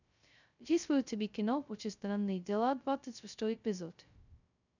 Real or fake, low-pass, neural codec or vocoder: fake; 7.2 kHz; codec, 16 kHz, 0.2 kbps, FocalCodec